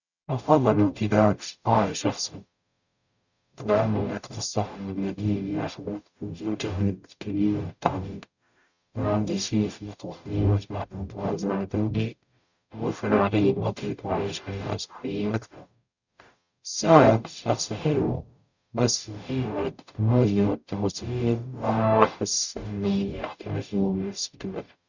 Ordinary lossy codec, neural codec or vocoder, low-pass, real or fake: none; codec, 44.1 kHz, 0.9 kbps, DAC; 7.2 kHz; fake